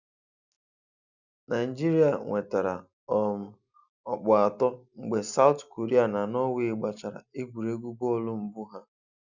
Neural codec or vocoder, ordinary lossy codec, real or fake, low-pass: none; none; real; 7.2 kHz